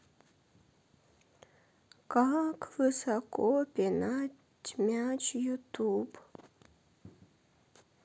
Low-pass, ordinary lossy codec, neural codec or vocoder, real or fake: none; none; none; real